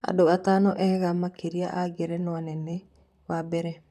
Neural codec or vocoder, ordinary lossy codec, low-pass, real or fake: vocoder, 44.1 kHz, 128 mel bands, Pupu-Vocoder; none; 14.4 kHz; fake